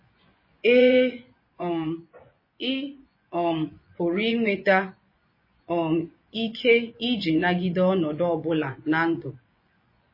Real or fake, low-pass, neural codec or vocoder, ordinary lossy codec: fake; 5.4 kHz; vocoder, 24 kHz, 100 mel bands, Vocos; MP3, 24 kbps